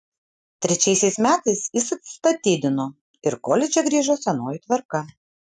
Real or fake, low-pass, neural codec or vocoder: real; 10.8 kHz; none